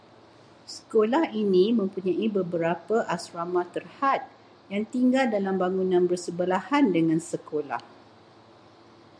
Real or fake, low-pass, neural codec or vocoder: real; 9.9 kHz; none